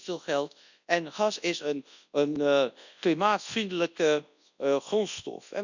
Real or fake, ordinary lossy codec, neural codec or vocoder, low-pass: fake; none; codec, 24 kHz, 0.9 kbps, WavTokenizer, large speech release; 7.2 kHz